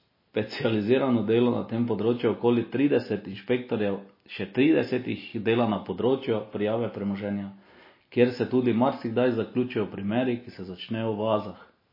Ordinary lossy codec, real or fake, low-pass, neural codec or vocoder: MP3, 24 kbps; real; 5.4 kHz; none